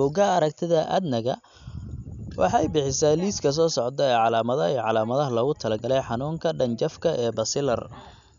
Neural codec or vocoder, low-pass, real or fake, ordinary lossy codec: none; 7.2 kHz; real; none